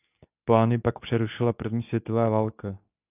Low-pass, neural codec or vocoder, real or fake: 3.6 kHz; codec, 24 kHz, 0.9 kbps, WavTokenizer, medium speech release version 2; fake